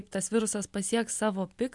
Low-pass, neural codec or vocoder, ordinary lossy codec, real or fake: 10.8 kHz; none; MP3, 96 kbps; real